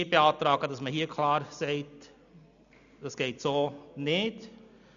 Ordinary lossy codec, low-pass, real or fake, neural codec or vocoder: none; 7.2 kHz; real; none